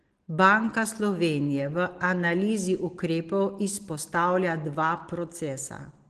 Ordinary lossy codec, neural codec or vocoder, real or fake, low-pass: Opus, 16 kbps; none; real; 10.8 kHz